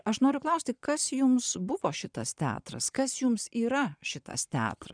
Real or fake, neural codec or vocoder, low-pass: fake; vocoder, 22.05 kHz, 80 mel bands, Vocos; 9.9 kHz